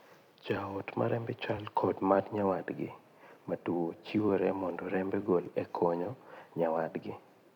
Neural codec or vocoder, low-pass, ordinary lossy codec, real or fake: vocoder, 44.1 kHz, 128 mel bands every 256 samples, BigVGAN v2; 19.8 kHz; MP3, 96 kbps; fake